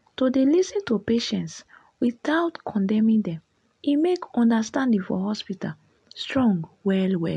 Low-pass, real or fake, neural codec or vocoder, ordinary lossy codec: 10.8 kHz; real; none; MP3, 64 kbps